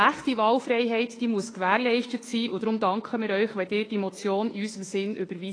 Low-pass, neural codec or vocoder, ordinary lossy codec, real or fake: 9.9 kHz; autoencoder, 48 kHz, 32 numbers a frame, DAC-VAE, trained on Japanese speech; AAC, 32 kbps; fake